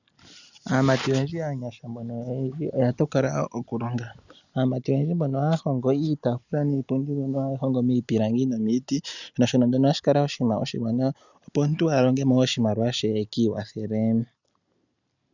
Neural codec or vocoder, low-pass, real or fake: none; 7.2 kHz; real